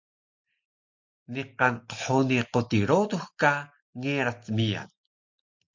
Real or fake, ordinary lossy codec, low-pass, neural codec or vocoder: real; MP3, 48 kbps; 7.2 kHz; none